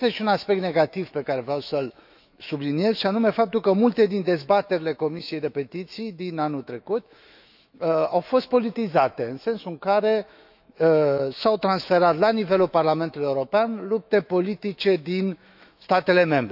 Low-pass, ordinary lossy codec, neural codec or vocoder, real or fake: 5.4 kHz; none; autoencoder, 48 kHz, 128 numbers a frame, DAC-VAE, trained on Japanese speech; fake